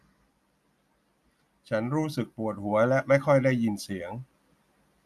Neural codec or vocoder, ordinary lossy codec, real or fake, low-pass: none; none; real; 14.4 kHz